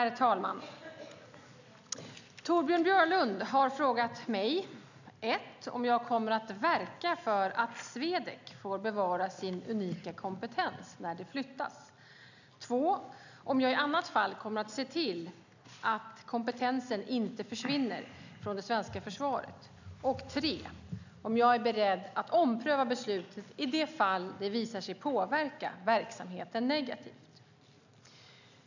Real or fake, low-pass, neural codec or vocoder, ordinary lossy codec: real; 7.2 kHz; none; none